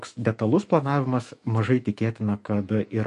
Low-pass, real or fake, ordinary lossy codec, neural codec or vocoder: 14.4 kHz; fake; MP3, 48 kbps; autoencoder, 48 kHz, 128 numbers a frame, DAC-VAE, trained on Japanese speech